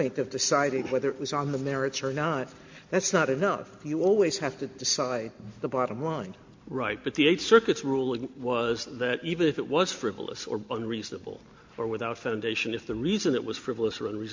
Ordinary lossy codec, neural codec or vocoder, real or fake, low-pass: MP3, 48 kbps; none; real; 7.2 kHz